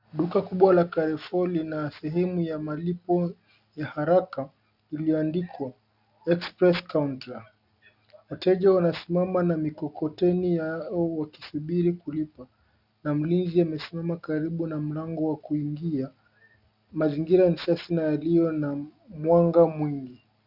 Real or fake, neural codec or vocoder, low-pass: real; none; 5.4 kHz